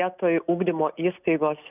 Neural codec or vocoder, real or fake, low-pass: none; real; 3.6 kHz